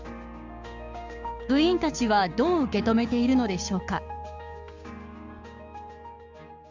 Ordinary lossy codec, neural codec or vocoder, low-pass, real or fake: Opus, 32 kbps; codec, 16 kHz, 6 kbps, DAC; 7.2 kHz; fake